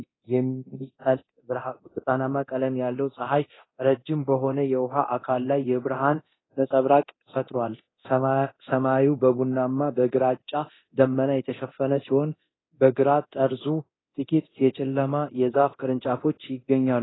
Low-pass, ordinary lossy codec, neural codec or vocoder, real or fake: 7.2 kHz; AAC, 16 kbps; codec, 24 kHz, 0.9 kbps, DualCodec; fake